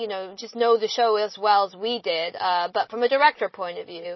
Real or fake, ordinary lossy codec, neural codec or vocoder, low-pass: real; MP3, 24 kbps; none; 7.2 kHz